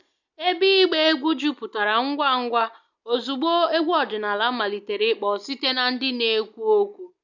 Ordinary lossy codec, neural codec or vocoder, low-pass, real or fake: none; none; 7.2 kHz; real